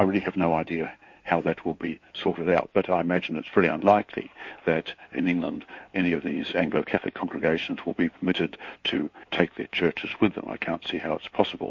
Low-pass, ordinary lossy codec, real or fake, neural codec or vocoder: 7.2 kHz; MP3, 48 kbps; fake; codec, 16 kHz in and 24 kHz out, 2.2 kbps, FireRedTTS-2 codec